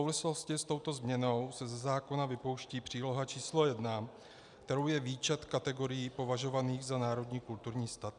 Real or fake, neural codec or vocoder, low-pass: real; none; 10.8 kHz